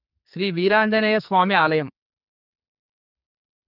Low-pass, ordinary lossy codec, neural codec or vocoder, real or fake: 5.4 kHz; none; codec, 44.1 kHz, 2.6 kbps, SNAC; fake